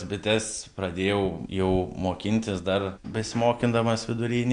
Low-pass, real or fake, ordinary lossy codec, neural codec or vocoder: 9.9 kHz; real; MP3, 64 kbps; none